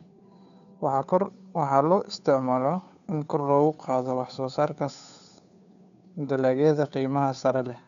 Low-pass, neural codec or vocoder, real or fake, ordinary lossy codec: 7.2 kHz; codec, 16 kHz, 2 kbps, FunCodec, trained on Chinese and English, 25 frames a second; fake; none